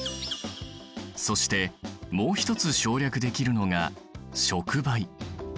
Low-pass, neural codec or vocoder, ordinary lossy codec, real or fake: none; none; none; real